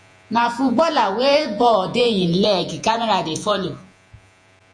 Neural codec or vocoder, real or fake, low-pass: vocoder, 48 kHz, 128 mel bands, Vocos; fake; 9.9 kHz